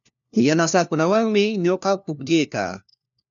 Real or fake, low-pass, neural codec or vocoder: fake; 7.2 kHz; codec, 16 kHz, 1 kbps, FunCodec, trained on LibriTTS, 50 frames a second